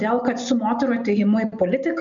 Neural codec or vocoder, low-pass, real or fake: none; 7.2 kHz; real